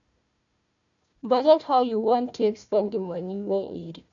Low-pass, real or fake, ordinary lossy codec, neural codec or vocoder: 7.2 kHz; fake; none; codec, 16 kHz, 1 kbps, FunCodec, trained on Chinese and English, 50 frames a second